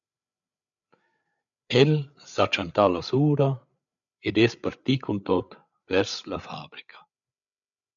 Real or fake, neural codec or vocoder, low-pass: fake; codec, 16 kHz, 8 kbps, FreqCodec, larger model; 7.2 kHz